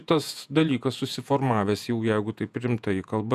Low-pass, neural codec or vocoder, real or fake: 14.4 kHz; vocoder, 48 kHz, 128 mel bands, Vocos; fake